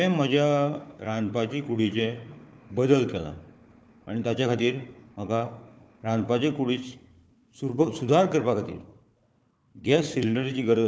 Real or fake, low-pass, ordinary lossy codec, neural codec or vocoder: fake; none; none; codec, 16 kHz, 4 kbps, FunCodec, trained on Chinese and English, 50 frames a second